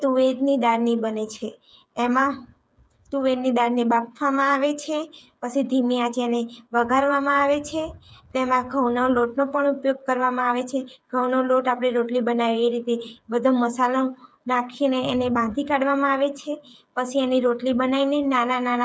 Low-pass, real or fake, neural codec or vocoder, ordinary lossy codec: none; fake; codec, 16 kHz, 8 kbps, FreqCodec, smaller model; none